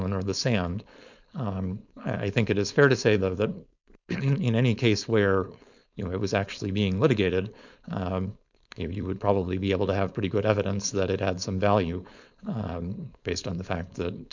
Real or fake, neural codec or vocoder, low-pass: fake; codec, 16 kHz, 4.8 kbps, FACodec; 7.2 kHz